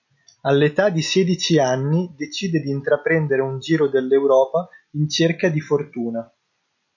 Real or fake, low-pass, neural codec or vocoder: real; 7.2 kHz; none